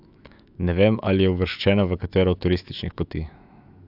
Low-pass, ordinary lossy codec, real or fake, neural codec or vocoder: 5.4 kHz; AAC, 48 kbps; fake; autoencoder, 48 kHz, 128 numbers a frame, DAC-VAE, trained on Japanese speech